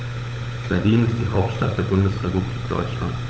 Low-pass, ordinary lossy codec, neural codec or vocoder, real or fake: none; none; codec, 16 kHz, 16 kbps, FunCodec, trained on LibriTTS, 50 frames a second; fake